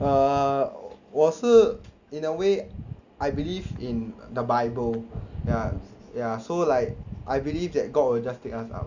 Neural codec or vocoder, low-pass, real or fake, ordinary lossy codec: none; 7.2 kHz; real; Opus, 64 kbps